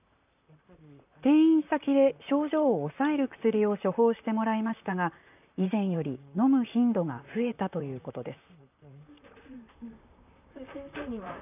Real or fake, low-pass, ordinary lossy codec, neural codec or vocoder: fake; 3.6 kHz; none; vocoder, 44.1 kHz, 128 mel bands, Pupu-Vocoder